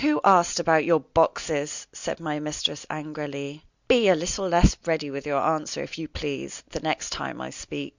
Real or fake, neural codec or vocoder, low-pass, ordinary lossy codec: real; none; 7.2 kHz; Opus, 64 kbps